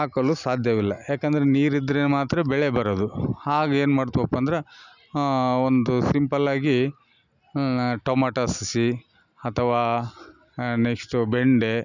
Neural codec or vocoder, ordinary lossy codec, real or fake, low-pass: none; none; real; 7.2 kHz